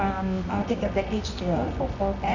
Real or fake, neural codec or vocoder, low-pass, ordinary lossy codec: fake; codec, 24 kHz, 0.9 kbps, WavTokenizer, medium music audio release; 7.2 kHz; none